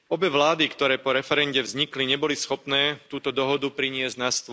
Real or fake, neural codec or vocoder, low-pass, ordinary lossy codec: real; none; none; none